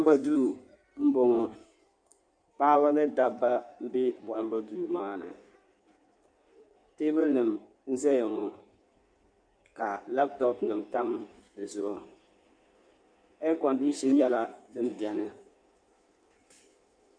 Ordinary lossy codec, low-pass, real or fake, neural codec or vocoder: MP3, 96 kbps; 9.9 kHz; fake; codec, 16 kHz in and 24 kHz out, 1.1 kbps, FireRedTTS-2 codec